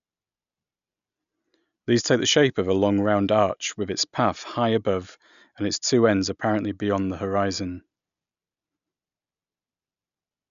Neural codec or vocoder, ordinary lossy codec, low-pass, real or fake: none; none; 7.2 kHz; real